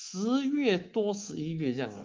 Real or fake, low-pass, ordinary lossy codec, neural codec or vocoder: fake; 7.2 kHz; Opus, 24 kbps; codec, 24 kHz, 3.1 kbps, DualCodec